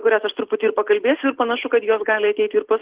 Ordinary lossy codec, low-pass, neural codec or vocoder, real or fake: Opus, 16 kbps; 3.6 kHz; none; real